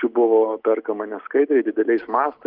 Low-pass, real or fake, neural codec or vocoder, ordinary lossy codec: 5.4 kHz; real; none; Opus, 32 kbps